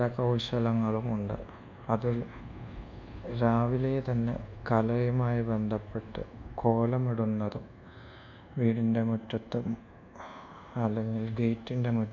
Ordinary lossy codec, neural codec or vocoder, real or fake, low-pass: MP3, 64 kbps; codec, 24 kHz, 1.2 kbps, DualCodec; fake; 7.2 kHz